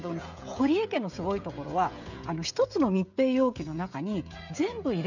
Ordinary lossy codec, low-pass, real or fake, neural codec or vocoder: none; 7.2 kHz; fake; codec, 16 kHz, 16 kbps, FreqCodec, smaller model